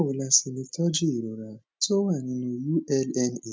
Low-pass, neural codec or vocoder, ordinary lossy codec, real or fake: none; none; none; real